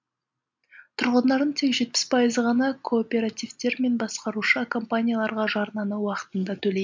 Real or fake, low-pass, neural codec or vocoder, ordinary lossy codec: real; 7.2 kHz; none; MP3, 64 kbps